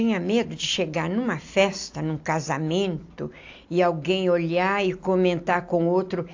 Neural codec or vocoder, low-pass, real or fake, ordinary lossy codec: none; 7.2 kHz; real; AAC, 48 kbps